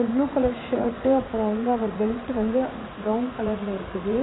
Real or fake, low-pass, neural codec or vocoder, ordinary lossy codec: fake; 7.2 kHz; vocoder, 44.1 kHz, 80 mel bands, Vocos; AAC, 16 kbps